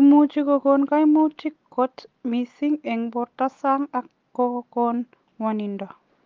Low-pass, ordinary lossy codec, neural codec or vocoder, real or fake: 7.2 kHz; Opus, 24 kbps; none; real